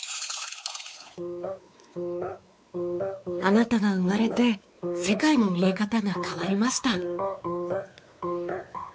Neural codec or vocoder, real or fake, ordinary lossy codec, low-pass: codec, 16 kHz, 4 kbps, X-Codec, WavLM features, trained on Multilingual LibriSpeech; fake; none; none